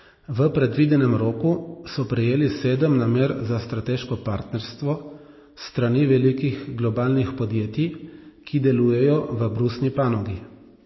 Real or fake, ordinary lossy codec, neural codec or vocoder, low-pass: real; MP3, 24 kbps; none; 7.2 kHz